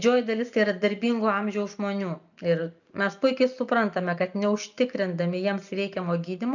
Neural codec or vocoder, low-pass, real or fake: none; 7.2 kHz; real